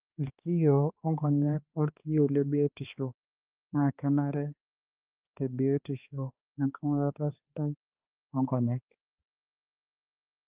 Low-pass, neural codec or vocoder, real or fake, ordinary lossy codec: 3.6 kHz; codec, 16 kHz, 2 kbps, X-Codec, HuBERT features, trained on balanced general audio; fake; Opus, 32 kbps